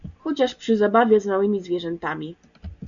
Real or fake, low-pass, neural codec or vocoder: real; 7.2 kHz; none